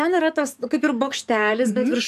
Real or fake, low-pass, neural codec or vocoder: fake; 14.4 kHz; codec, 44.1 kHz, 7.8 kbps, DAC